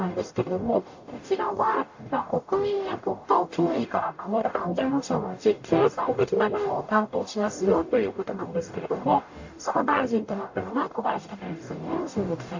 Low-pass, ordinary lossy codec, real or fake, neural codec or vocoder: 7.2 kHz; AAC, 48 kbps; fake; codec, 44.1 kHz, 0.9 kbps, DAC